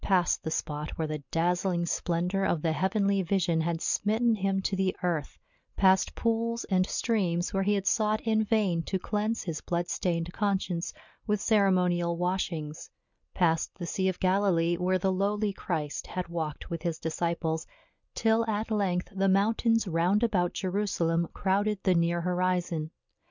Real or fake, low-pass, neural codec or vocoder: real; 7.2 kHz; none